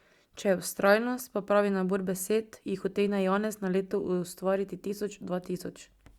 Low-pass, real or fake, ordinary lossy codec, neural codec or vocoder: 19.8 kHz; real; Opus, 64 kbps; none